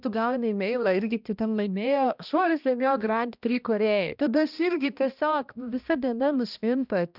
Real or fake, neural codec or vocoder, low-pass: fake; codec, 16 kHz, 1 kbps, X-Codec, HuBERT features, trained on balanced general audio; 5.4 kHz